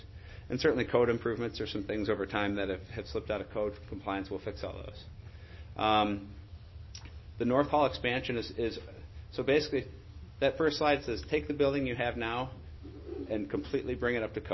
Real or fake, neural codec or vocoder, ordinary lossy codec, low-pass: real; none; MP3, 24 kbps; 7.2 kHz